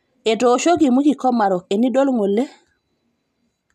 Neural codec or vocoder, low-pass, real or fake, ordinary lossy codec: none; 14.4 kHz; real; none